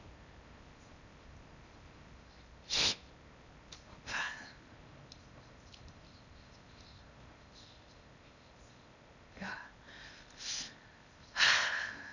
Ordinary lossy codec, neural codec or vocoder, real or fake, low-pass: none; codec, 16 kHz in and 24 kHz out, 0.8 kbps, FocalCodec, streaming, 65536 codes; fake; 7.2 kHz